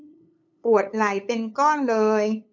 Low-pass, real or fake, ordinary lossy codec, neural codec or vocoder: 7.2 kHz; fake; none; codec, 16 kHz, 8 kbps, FunCodec, trained on LibriTTS, 25 frames a second